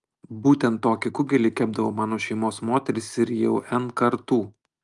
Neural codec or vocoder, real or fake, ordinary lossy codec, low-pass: none; real; Opus, 32 kbps; 10.8 kHz